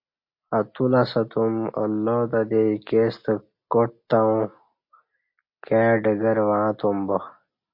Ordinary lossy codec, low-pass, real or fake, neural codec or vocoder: MP3, 32 kbps; 5.4 kHz; real; none